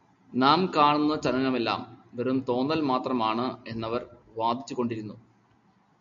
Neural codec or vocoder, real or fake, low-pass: none; real; 7.2 kHz